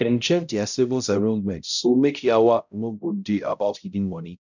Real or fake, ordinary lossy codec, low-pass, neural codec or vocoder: fake; none; 7.2 kHz; codec, 16 kHz, 0.5 kbps, X-Codec, HuBERT features, trained on balanced general audio